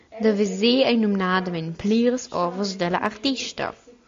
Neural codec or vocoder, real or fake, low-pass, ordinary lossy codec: none; real; 7.2 kHz; AAC, 48 kbps